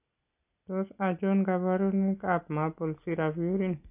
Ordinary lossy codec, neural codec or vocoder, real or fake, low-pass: AAC, 24 kbps; none; real; 3.6 kHz